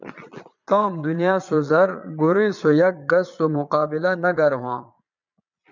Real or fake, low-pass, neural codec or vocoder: fake; 7.2 kHz; vocoder, 22.05 kHz, 80 mel bands, Vocos